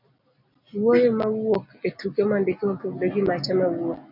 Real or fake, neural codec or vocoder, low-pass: real; none; 5.4 kHz